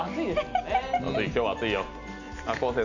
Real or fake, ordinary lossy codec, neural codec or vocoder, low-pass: real; none; none; 7.2 kHz